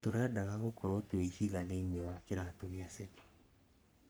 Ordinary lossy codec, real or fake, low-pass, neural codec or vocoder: none; fake; none; codec, 44.1 kHz, 3.4 kbps, Pupu-Codec